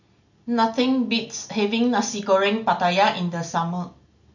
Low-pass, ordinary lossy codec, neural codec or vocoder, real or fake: 7.2 kHz; none; none; real